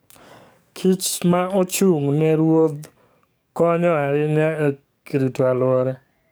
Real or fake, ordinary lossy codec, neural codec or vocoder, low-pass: fake; none; codec, 44.1 kHz, 7.8 kbps, DAC; none